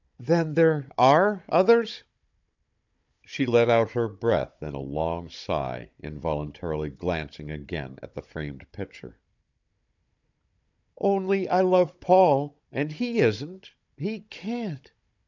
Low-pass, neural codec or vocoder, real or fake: 7.2 kHz; codec, 16 kHz, 16 kbps, FunCodec, trained on Chinese and English, 50 frames a second; fake